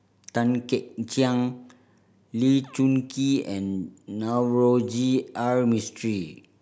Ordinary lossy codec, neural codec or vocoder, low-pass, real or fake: none; none; none; real